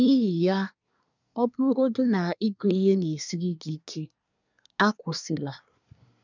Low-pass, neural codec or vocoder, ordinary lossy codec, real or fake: 7.2 kHz; codec, 24 kHz, 1 kbps, SNAC; none; fake